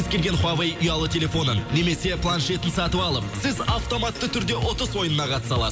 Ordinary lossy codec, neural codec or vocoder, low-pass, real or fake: none; none; none; real